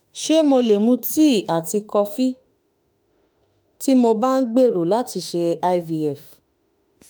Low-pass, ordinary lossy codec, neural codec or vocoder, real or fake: none; none; autoencoder, 48 kHz, 32 numbers a frame, DAC-VAE, trained on Japanese speech; fake